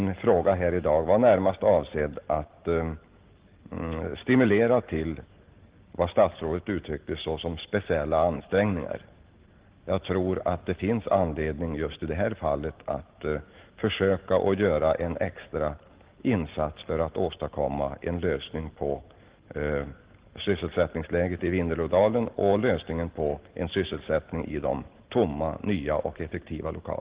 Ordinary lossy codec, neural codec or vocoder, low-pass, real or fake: Opus, 16 kbps; none; 3.6 kHz; real